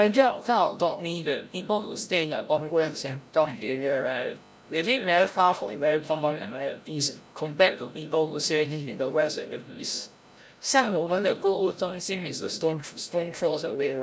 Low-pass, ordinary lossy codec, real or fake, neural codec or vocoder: none; none; fake; codec, 16 kHz, 0.5 kbps, FreqCodec, larger model